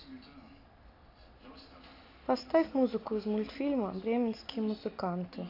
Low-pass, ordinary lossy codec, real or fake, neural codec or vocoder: 5.4 kHz; AAC, 32 kbps; real; none